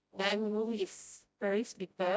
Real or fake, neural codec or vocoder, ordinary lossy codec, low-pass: fake; codec, 16 kHz, 0.5 kbps, FreqCodec, smaller model; none; none